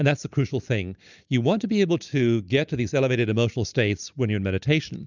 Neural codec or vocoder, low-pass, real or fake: none; 7.2 kHz; real